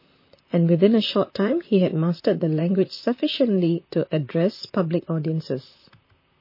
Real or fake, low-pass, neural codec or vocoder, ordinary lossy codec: fake; 5.4 kHz; vocoder, 44.1 kHz, 128 mel bands, Pupu-Vocoder; MP3, 24 kbps